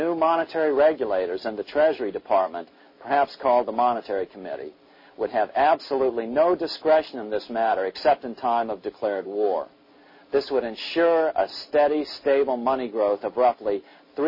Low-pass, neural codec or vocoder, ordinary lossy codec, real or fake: 5.4 kHz; none; MP3, 24 kbps; real